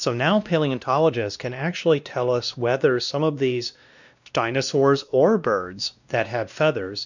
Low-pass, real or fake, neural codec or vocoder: 7.2 kHz; fake; codec, 16 kHz, 1 kbps, X-Codec, WavLM features, trained on Multilingual LibriSpeech